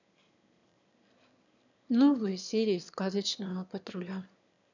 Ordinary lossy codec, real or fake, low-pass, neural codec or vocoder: none; fake; 7.2 kHz; autoencoder, 22.05 kHz, a latent of 192 numbers a frame, VITS, trained on one speaker